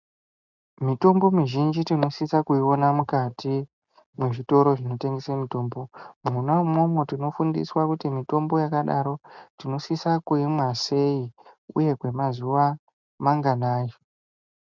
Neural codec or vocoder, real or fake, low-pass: none; real; 7.2 kHz